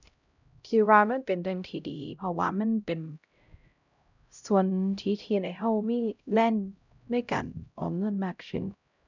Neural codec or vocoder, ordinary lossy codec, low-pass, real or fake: codec, 16 kHz, 0.5 kbps, X-Codec, HuBERT features, trained on LibriSpeech; none; 7.2 kHz; fake